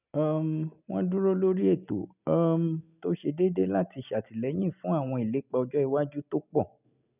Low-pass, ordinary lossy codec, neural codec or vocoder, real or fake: 3.6 kHz; none; none; real